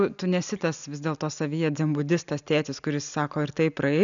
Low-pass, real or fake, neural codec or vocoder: 7.2 kHz; real; none